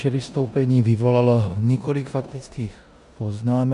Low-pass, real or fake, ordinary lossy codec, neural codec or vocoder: 10.8 kHz; fake; MP3, 96 kbps; codec, 16 kHz in and 24 kHz out, 0.9 kbps, LongCat-Audio-Codec, four codebook decoder